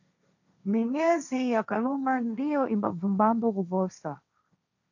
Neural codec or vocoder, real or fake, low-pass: codec, 16 kHz, 1.1 kbps, Voila-Tokenizer; fake; 7.2 kHz